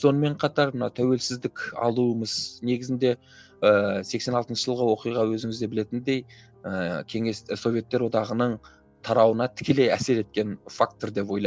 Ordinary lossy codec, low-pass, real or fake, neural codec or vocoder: none; none; real; none